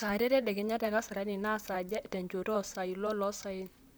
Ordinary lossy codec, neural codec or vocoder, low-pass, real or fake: none; vocoder, 44.1 kHz, 128 mel bands, Pupu-Vocoder; none; fake